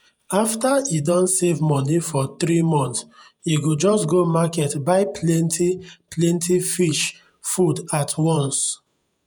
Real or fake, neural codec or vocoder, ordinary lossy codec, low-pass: fake; vocoder, 48 kHz, 128 mel bands, Vocos; none; none